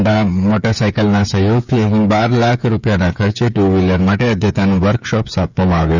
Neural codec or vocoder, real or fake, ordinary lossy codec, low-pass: codec, 16 kHz, 16 kbps, FreqCodec, smaller model; fake; none; 7.2 kHz